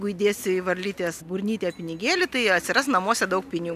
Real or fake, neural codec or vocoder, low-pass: real; none; 14.4 kHz